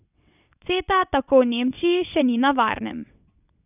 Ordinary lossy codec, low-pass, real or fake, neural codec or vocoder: none; 3.6 kHz; fake; vocoder, 22.05 kHz, 80 mel bands, WaveNeXt